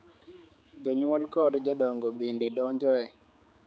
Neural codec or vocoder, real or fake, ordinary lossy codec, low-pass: codec, 16 kHz, 4 kbps, X-Codec, HuBERT features, trained on general audio; fake; none; none